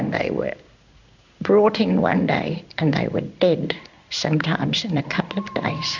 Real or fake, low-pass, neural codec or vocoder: fake; 7.2 kHz; codec, 16 kHz in and 24 kHz out, 1 kbps, XY-Tokenizer